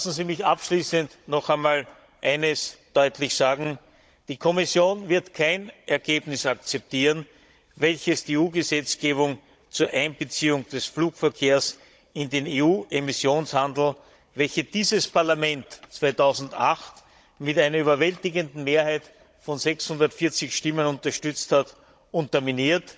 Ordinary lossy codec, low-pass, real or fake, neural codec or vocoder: none; none; fake; codec, 16 kHz, 16 kbps, FunCodec, trained on Chinese and English, 50 frames a second